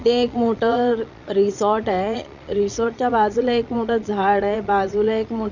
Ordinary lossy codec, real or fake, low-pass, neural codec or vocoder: none; fake; 7.2 kHz; vocoder, 22.05 kHz, 80 mel bands, Vocos